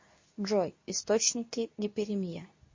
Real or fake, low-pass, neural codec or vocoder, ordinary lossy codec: fake; 7.2 kHz; codec, 24 kHz, 0.9 kbps, WavTokenizer, medium speech release version 2; MP3, 32 kbps